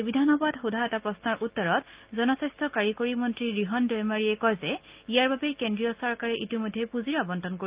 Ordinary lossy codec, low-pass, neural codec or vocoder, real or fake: Opus, 32 kbps; 3.6 kHz; none; real